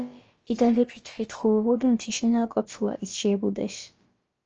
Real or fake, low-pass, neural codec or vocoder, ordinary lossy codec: fake; 7.2 kHz; codec, 16 kHz, about 1 kbps, DyCAST, with the encoder's durations; Opus, 24 kbps